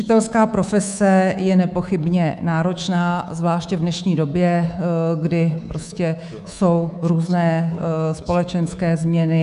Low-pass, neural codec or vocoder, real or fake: 10.8 kHz; codec, 24 kHz, 3.1 kbps, DualCodec; fake